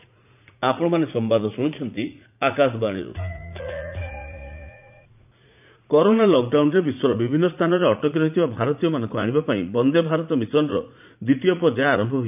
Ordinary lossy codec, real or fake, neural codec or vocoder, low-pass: none; fake; vocoder, 44.1 kHz, 80 mel bands, Vocos; 3.6 kHz